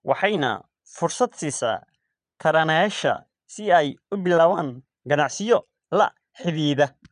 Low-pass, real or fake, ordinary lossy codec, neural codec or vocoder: 9.9 kHz; fake; none; vocoder, 22.05 kHz, 80 mel bands, Vocos